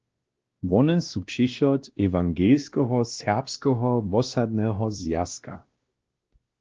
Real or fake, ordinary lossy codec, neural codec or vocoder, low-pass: fake; Opus, 16 kbps; codec, 16 kHz, 1 kbps, X-Codec, WavLM features, trained on Multilingual LibriSpeech; 7.2 kHz